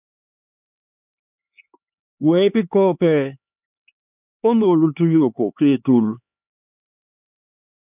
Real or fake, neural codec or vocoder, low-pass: fake; codec, 16 kHz, 4 kbps, X-Codec, HuBERT features, trained on LibriSpeech; 3.6 kHz